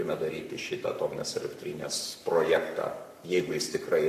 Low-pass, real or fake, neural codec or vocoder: 14.4 kHz; fake; codec, 44.1 kHz, 7.8 kbps, Pupu-Codec